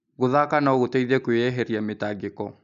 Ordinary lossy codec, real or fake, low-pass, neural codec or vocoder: none; real; 7.2 kHz; none